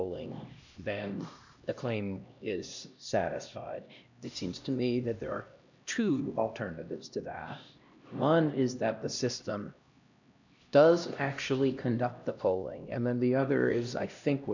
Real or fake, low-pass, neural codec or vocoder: fake; 7.2 kHz; codec, 16 kHz, 1 kbps, X-Codec, HuBERT features, trained on LibriSpeech